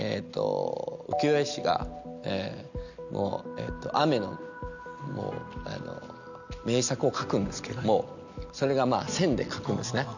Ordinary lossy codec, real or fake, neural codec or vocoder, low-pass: none; real; none; 7.2 kHz